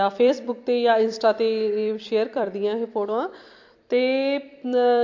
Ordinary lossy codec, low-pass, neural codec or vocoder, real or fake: MP3, 48 kbps; 7.2 kHz; vocoder, 44.1 kHz, 128 mel bands every 256 samples, BigVGAN v2; fake